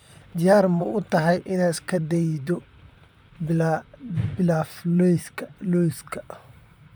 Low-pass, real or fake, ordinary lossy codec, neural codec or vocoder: none; fake; none; vocoder, 44.1 kHz, 128 mel bands, Pupu-Vocoder